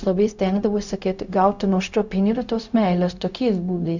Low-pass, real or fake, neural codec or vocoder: 7.2 kHz; fake; codec, 16 kHz, 0.4 kbps, LongCat-Audio-Codec